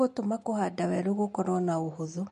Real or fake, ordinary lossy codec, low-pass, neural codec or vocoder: real; MP3, 48 kbps; 14.4 kHz; none